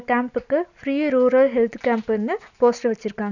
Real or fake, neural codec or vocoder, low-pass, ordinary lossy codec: real; none; 7.2 kHz; none